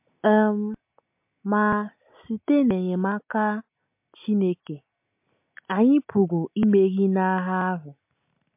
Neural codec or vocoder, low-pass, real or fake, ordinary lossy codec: none; 3.6 kHz; real; MP3, 32 kbps